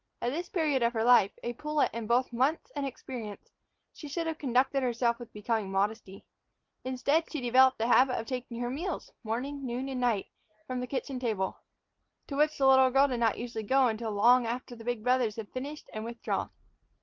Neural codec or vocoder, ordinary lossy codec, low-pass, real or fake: none; Opus, 24 kbps; 7.2 kHz; real